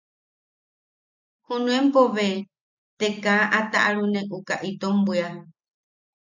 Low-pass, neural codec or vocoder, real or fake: 7.2 kHz; none; real